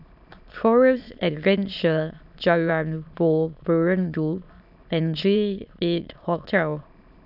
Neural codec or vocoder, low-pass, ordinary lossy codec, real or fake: autoencoder, 22.05 kHz, a latent of 192 numbers a frame, VITS, trained on many speakers; 5.4 kHz; none; fake